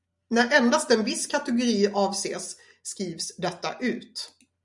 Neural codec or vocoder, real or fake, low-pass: none; real; 10.8 kHz